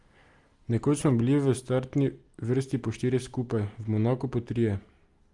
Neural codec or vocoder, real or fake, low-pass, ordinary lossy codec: none; real; 10.8 kHz; Opus, 24 kbps